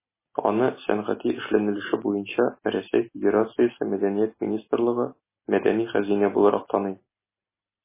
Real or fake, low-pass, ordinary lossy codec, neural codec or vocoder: real; 3.6 kHz; MP3, 16 kbps; none